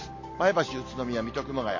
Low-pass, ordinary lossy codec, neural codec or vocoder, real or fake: 7.2 kHz; MP3, 32 kbps; none; real